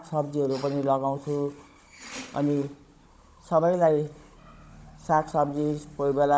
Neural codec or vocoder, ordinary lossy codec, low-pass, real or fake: codec, 16 kHz, 16 kbps, FunCodec, trained on Chinese and English, 50 frames a second; none; none; fake